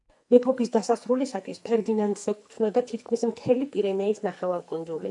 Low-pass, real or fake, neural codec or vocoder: 10.8 kHz; fake; codec, 44.1 kHz, 2.6 kbps, SNAC